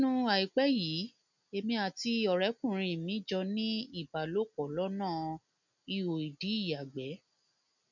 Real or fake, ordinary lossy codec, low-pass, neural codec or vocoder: real; none; 7.2 kHz; none